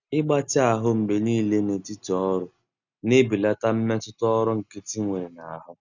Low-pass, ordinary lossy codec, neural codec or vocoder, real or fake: 7.2 kHz; none; none; real